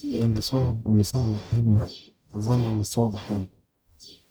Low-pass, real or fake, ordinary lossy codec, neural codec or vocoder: none; fake; none; codec, 44.1 kHz, 0.9 kbps, DAC